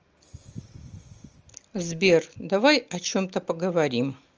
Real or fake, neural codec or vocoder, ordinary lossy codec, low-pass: real; none; Opus, 24 kbps; 7.2 kHz